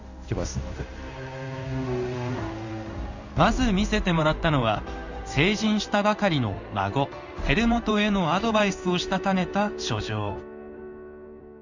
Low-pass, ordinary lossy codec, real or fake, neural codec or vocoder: 7.2 kHz; none; fake; codec, 16 kHz in and 24 kHz out, 1 kbps, XY-Tokenizer